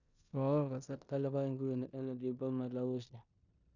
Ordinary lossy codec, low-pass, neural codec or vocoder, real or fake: none; 7.2 kHz; codec, 16 kHz in and 24 kHz out, 0.9 kbps, LongCat-Audio-Codec, four codebook decoder; fake